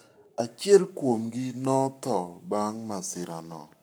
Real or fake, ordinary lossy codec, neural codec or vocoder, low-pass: fake; none; codec, 44.1 kHz, 7.8 kbps, Pupu-Codec; none